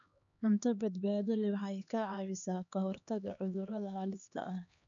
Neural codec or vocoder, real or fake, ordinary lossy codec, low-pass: codec, 16 kHz, 2 kbps, X-Codec, HuBERT features, trained on LibriSpeech; fake; none; 7.2 kHz